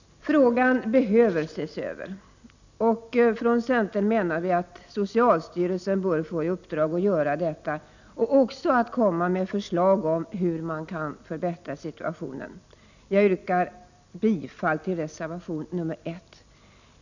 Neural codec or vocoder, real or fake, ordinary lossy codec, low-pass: none; real; none; 7.2 kHz